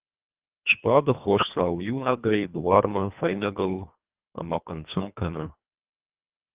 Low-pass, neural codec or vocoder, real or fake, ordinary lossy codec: 3.6 kHz; codec, 24 kHz, 1.5 kbps, HILCodec; fake; Opus, 32 kbps